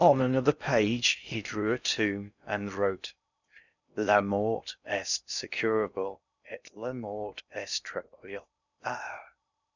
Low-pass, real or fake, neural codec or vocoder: 7.2 kHz; fake; codec, 16 kHz in and 24 kHz out, 0.6 kbps, FocalCodec, streaming, 4096 codes